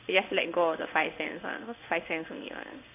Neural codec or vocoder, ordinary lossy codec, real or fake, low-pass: codec, 16 kHz in and 24 kHz out, 1 kbps, XY-Tokenizer; none; fake; 3.6 kHz